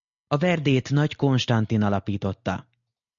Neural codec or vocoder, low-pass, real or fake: none; 7.2 kHz; real